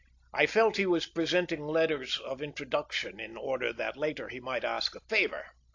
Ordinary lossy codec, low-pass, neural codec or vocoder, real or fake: AAC, 48 kbps; 7.2 kHz; none; real